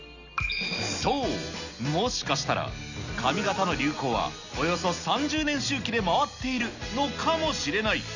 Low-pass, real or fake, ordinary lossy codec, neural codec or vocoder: 7.2 kHz; real; none; none